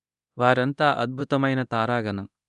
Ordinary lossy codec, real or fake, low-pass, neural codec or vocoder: none; fake; 10.8 kHz; codec, 24 kHz, 0.9 kbps, DualCodec